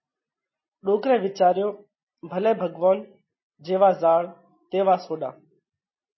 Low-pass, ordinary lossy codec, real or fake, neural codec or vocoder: 7.2 kHz; MP3, 24 kbps; real; none